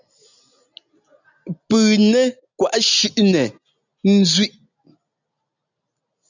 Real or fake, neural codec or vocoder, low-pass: real; none; 7.2 kHz